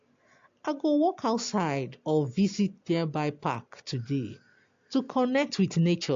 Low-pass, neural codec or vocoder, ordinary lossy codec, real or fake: 7.2 kHz; none; AAC, 48 kbps; real